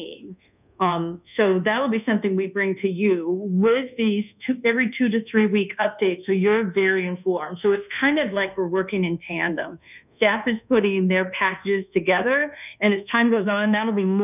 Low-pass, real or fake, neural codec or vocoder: 3.6 kHz; fake; codec, 24 kHz, 1.2 kbps, DualCodec